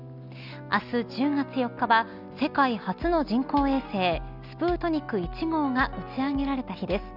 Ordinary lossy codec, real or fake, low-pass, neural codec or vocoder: none; real; 5.4 kHz; none